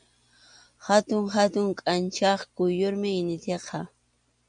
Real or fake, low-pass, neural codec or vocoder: real; 9.9 kHz; none